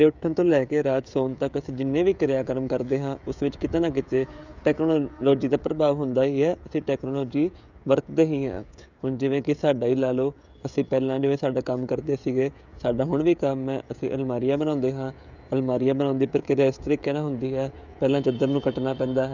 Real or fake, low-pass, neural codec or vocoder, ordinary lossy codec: fake; 7.2 kHz; codec, 16 kHz, 16 kbps, FreqCodec, smaller model; none